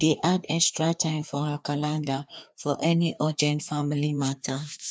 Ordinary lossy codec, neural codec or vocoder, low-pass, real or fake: none; codec, 16 kHz, 2 kbps, FreqCodec, larger model; none; fake